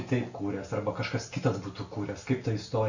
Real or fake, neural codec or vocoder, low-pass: real; none; 7.2 kHz